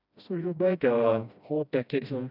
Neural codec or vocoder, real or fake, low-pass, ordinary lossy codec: codec, 16 kHz, 1 kbps, FreqCodec, smaller model; fake; 5.4 kHz; none